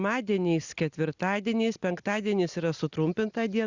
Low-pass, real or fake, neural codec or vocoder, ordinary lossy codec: 7.2 kHz; real; none; Opus, 64 kbps